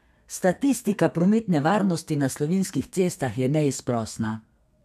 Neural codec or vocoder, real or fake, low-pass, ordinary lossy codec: codec, 32 kHz, 1.9 kbps, SNAC; fake; 14.4 kHz; none